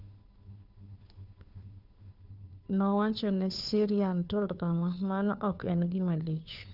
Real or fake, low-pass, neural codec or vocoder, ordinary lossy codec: fake; 5.4 kHz; codec, 16 kHz, 2 kbps, FunCodec, trained on Chinese and English, 25 frames a second; none